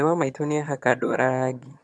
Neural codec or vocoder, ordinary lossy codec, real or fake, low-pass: vocoder, 22.05 kHz, 80 mel bands, HiFi-GAN; none; fake; none